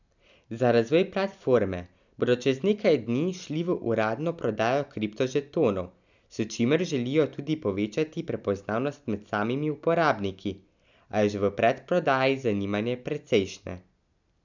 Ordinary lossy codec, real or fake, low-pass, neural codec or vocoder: none; real; 7.2 kHz; none